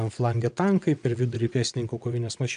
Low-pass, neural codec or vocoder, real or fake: 9.9 kHz; vocoder, 22.05 kHz, 80 mel bands, WaveNeXt; fake